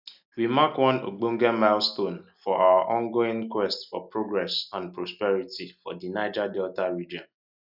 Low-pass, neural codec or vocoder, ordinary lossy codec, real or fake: 5.4 kHz; none; none; real